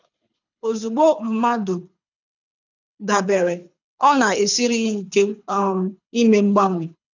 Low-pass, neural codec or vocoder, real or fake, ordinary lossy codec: 7.2 kHz; codec, 24 kHz, 6 kbps, HILCodec; fake; none